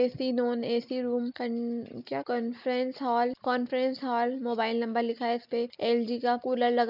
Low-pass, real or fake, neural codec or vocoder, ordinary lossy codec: 5.4 kHz; real; none; none